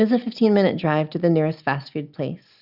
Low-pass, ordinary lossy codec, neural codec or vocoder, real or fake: 5.4 kHz; Opus, 64 kbps; none; real